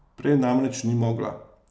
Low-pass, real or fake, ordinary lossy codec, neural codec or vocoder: none; real; none; none